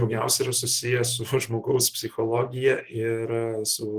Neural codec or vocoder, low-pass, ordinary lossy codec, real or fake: none; 14.4 kHz; Opus, 16 kbps; real